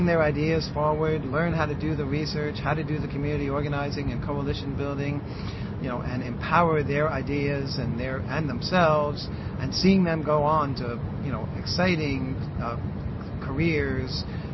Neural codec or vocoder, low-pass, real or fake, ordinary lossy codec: none; 7.2 kHz; real; MP3, 24 kbps